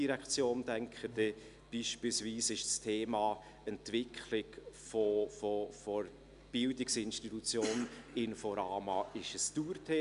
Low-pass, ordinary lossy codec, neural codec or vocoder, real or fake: 10.8 kHz; none; none; real